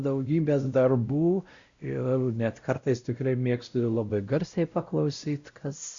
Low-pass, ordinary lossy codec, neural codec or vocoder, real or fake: 7.2 kHz; Opus, 64 kbps; codec, 16 kHz, 0.5 kbps, X-Codec, WavLM features, trained on Multilingual LibriSpeech; fake